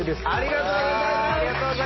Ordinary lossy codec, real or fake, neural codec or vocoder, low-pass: MP3, 24 kbps; real; none; 7.2 kHz